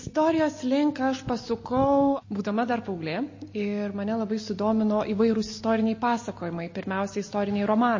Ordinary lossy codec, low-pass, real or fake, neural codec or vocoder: MP3, 32 kbps; 7.2 kHz; real; none